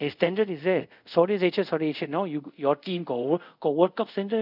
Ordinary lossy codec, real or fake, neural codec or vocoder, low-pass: none; fake; codec, 24 kHz, 0.5 kbps, DualCodec; 5.4 kHz